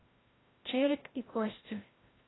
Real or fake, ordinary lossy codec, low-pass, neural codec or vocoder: fake; AAC, 16 kbps; 7.2 kHz; codec, 16 kHz, 0.5 kbps, FreqCodec, larger model